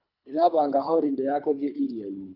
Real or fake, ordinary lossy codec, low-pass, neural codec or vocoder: fake; none; 5.4 kHz; codec, 24 kHz, 3 kbps, HILCodec